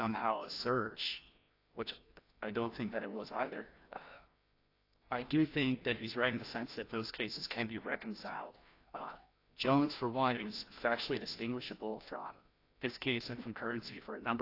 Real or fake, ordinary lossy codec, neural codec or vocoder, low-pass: fake; AAC, 32 kbps; codec, 16 kHz, 1 kbps, FreqCodec, larger model; 5.4 kHz